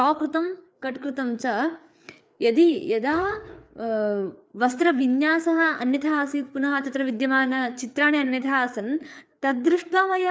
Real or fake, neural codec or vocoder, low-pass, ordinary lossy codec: fake; codec, 16 kHz, 4 kbps, FreqCodec, larger model; none; none